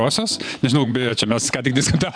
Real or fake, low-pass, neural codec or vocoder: fake; 9.9 kHz; vocoder, 24 kHz, 100 mel bands, Vocos